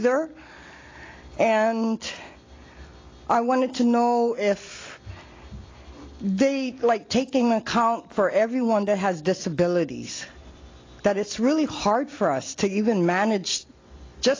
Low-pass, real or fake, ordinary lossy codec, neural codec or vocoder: 7.2 kHz; real; AAC, 32 kbps; none